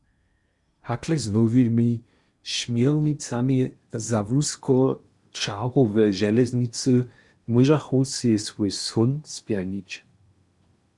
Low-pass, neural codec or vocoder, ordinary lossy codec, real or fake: 10.8 kHz; codec, 16 kHz in and 24 kHz out, 0.8 kbps, FocalCodec, streaming, 65536 codes; Opus, 64 kbps; fake